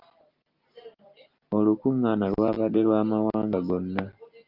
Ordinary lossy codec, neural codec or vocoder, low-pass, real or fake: Opus, 24 kbps; none; 5.4 kHz; real